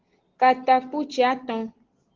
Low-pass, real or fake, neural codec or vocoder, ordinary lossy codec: 7.2 kHz; real; none; Opus, 16 kbps